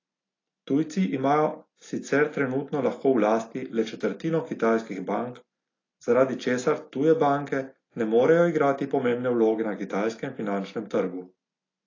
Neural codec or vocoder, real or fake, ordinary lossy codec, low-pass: none; real; AAC, 32 kbps; 7.2 kHz